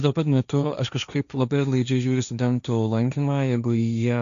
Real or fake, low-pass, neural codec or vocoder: fake; 7.2 kHz; codec, 16 kHz, 1.1 kbps, Voila-Tokenizer